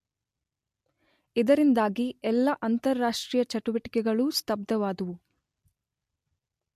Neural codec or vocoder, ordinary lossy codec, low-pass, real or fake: none; MP3, 64 kbps; 14.4 kHz; real